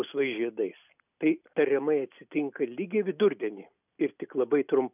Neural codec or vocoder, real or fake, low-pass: none; real; 3.6 kHz